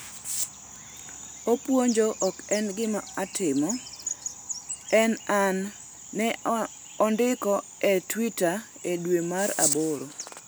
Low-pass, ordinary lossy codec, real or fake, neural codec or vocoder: none; none; real; none